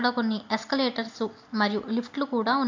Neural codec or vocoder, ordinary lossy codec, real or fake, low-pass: none; AAC, 48 kbps; real; 7.2 kHz